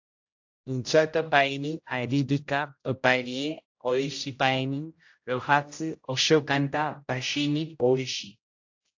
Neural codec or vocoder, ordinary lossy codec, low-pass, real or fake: codec, 16 kHz, 0.5 kbps, X-Codec, HuBERT features, trained on general audio; AAC, 48 kbps; 7.2 kHz; fake